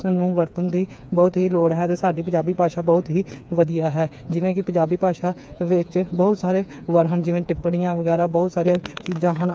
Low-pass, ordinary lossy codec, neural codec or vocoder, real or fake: none; none; codec, 16 kHz, 4 kbps, FreqCodec, smaller model; fake